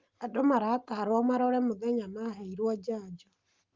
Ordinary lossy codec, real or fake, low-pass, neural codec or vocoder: Opus, 32 kbps; real; 7.2 kHz; none